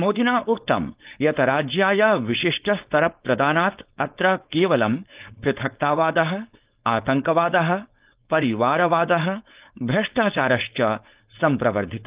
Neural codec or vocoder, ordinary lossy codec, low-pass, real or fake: codec, 16 kHz, 4.8 kbps, FACodec; Opus, 32 kbps; 3.6 kHz; fake